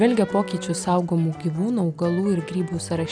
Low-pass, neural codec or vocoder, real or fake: 9.9 kHz; none; real